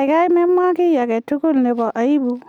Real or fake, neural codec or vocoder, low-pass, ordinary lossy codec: real; none; 19.8 kHz; none